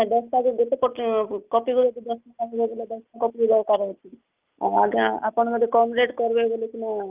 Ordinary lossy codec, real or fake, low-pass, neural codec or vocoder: Opus, 32 kbps; real; 3.6 kHz; none